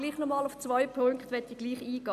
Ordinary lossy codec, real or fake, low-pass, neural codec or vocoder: none; real; 14.4 kHz; none